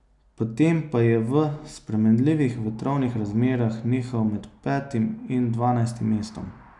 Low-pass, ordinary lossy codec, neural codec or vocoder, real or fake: 10.8 kHz; none; none; real